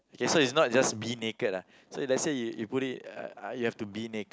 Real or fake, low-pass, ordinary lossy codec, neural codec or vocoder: real; none; none; none